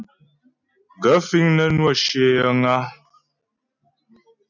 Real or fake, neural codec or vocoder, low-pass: real; none; 7.2 kHz